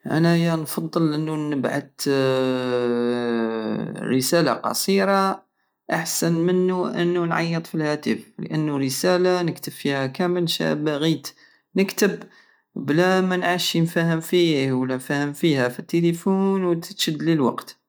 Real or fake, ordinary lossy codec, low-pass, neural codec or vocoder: real; none; none; none